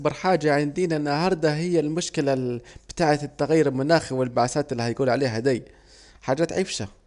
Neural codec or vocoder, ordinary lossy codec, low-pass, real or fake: none; none; 10.8 kHz; real